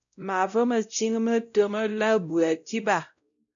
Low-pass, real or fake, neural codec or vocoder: 7.2 kHz; fake; codec, 16 kHz, 0.5 kbps, X-Codec, WavLM features, trained on Multilingual LibriSpeech